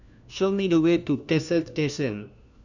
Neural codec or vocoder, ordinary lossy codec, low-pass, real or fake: codec, 16 kHz, 1 kbps, FunCodec, trained on LibriTTS, 50 frames a second; none; 7.2 kHz; fake